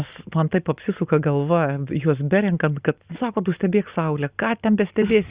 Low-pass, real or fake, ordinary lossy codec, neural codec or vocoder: 3.6 kHz; real; Opus, 64 kbps; none